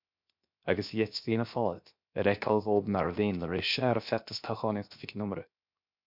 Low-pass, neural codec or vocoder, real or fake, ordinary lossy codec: 5.4 kHz; codec, 16 kHz, 0.7 kbps, FocalCodec; fake; MP3, 48 kbps